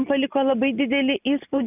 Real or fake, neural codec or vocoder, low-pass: real; none; 3.6 kHz